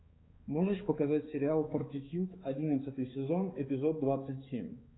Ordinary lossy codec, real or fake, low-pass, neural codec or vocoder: AAC, 16 kbps; fake; 7.2 kHz; codec, 16 kHz, 4 kbps, X-Codec, HuBERT features, trained on balanced general audio